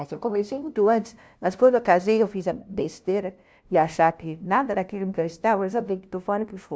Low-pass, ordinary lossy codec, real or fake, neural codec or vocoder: none; none; fake; codec, 16 kHz, 0.5 kbps, FunCodec, trained on LibriTTS, 25 frames a second